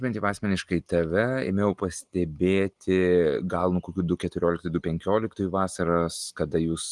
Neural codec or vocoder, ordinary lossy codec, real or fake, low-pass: none; Opus, 24 kbps; real; 10.8 kHz